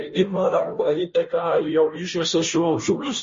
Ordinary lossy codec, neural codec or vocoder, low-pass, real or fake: MP3, 32 kbps; codec, 16 kHz, 0.5 kbps, FunCodec, trained on Chinese and English, 25 frames a second; 7.2 kHz; fake